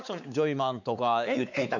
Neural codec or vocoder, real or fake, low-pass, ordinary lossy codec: codec, 16 kHz, 4 kbps, X-Codec, WavLM features, trained on Multilingual LibriSpeech; fake; 7.2 kHz; none